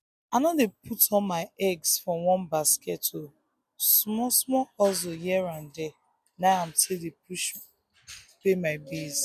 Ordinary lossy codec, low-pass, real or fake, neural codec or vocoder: MP3, 96 kbps; 14.4 kHz; real; none